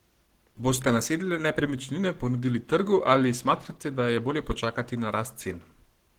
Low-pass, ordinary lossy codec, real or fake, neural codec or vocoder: 19.8 kHz; Opus, 16 kbps; fake; codec, 44.1 kHz, 7.8 kbps, Pupu-Codec